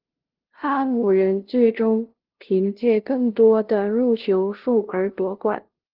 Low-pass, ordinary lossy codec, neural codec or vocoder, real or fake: 5.4 kHz; Opus, 16 kbps; codec, 16 kHz, 0.5 kbps, FunCodec, trained on LibriTTS, 25 frames a second; fake